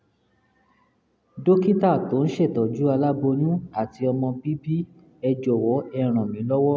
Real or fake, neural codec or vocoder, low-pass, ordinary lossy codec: real; none; none; none